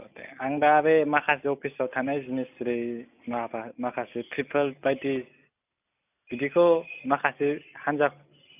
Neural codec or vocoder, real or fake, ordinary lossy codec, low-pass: none; real; none; 3.6 kHz